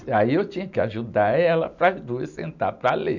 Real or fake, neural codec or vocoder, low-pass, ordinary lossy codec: real; none; 7.2 kHz; none